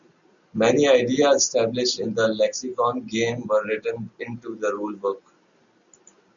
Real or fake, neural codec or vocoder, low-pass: real; none; 7.2 kHz